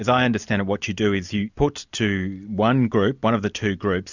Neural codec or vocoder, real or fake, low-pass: none; real; 7.2 kHz